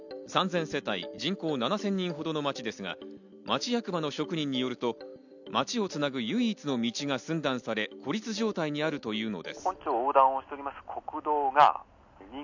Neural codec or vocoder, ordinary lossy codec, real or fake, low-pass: none; none; real; 7.2 kHz